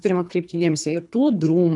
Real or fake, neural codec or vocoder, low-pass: fake; codec, 24 kHz, 3 kbps, HILCodec; 10.8 kHz